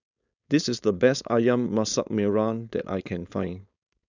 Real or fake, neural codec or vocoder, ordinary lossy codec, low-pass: fake; codec, 16 kHz, 4.8 kbps, FACodec; none; 7.2 kHz